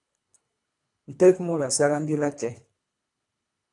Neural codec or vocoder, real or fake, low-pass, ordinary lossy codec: codec, 24 kHz, 3 kbps, HILCodec; fake; 10.8 kHz; AAC, 64 kbps